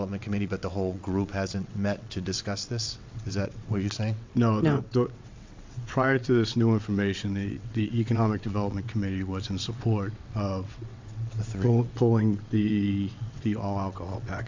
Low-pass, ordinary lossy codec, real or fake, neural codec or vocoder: 7.2 kHz; MP3, 64 kbps; fake; vocoder, 22.05 kHz, 80 mel bands, WaveNeXt